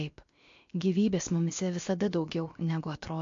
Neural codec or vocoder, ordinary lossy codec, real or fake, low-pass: codec, 16 kHz, about 1 kbps, DyCAST, with the encoder's durations; MP3, 48 kbps; fake; 7.2 kHz